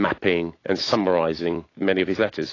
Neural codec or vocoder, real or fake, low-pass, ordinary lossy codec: autoencoder, 48 kHz, 128 numbers a frame, DAC-VAE, trained on Japanese speech; fake; 7.2 kHz; AAC, 32 kbps